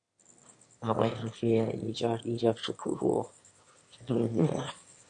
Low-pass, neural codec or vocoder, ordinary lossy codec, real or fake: 9.9 kHz; autoencoder, 22.05 kHz, a latent of 192 numbers a frame, VITS, trained on one speaker; MP3, 48 kbps; fake